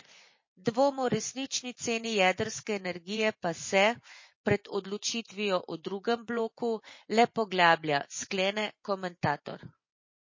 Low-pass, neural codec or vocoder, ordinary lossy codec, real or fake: 7.2 kHz; vocoder, 24 kHz, 100 mel bands, Vocos; MP3, 32 kbps; fake